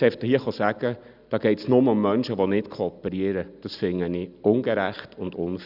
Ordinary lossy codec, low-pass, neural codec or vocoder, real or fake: none; 5.4 kHz; none; real